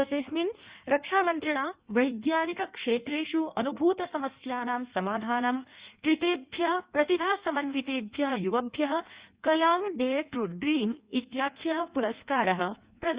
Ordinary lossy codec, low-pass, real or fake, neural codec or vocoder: Opus, 64 kbps; 3.6 kHz; fake; codec, 16 kHz in and 24 kHz out, 1.1 kbps, FireRedTTS-2 codec